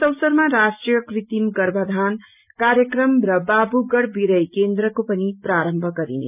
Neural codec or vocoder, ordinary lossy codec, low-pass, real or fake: none; none; 3.6 kHz; real